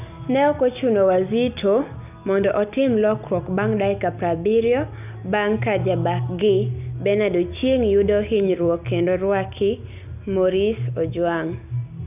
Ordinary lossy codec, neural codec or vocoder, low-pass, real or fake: none; none; 3.6 kHz; real